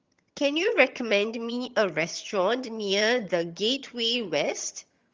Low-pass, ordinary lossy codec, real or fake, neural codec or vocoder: 7.2 kHz; Opus, 32 kbps; fake; vocoder, 22.05 kHz, 80 mel bands, HiFi-GAN